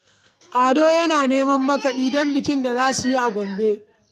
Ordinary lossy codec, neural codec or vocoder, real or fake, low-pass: none; codec, 44.1 kHz, 2.6 kbps, SNAC; fake; 14.4 kHz